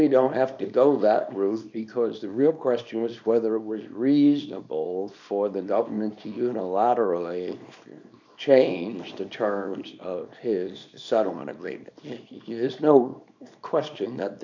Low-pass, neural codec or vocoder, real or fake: 7.2 kHz; codec, 24 kHz, 0.9 kbps, WavTokenizer, small release; fake